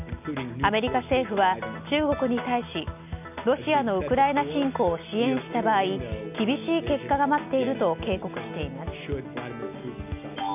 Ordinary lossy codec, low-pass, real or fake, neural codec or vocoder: none; 3.6 kHz; real; none